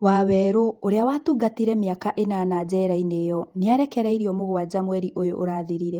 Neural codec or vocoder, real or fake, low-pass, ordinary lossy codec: vocoder, 48 kHz, 128 mel bands, Vocos; fake; 19.8 kHz; Opus, 24 kbps